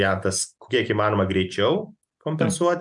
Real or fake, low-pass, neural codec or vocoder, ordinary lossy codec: real; 10.8 kHz; none; MP3, 96 kbps